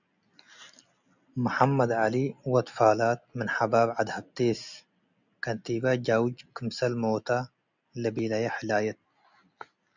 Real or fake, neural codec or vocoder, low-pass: real; none; 7.2 kHz